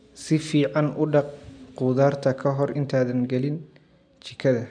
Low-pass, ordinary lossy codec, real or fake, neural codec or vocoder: 9.9 kHz; none; real; none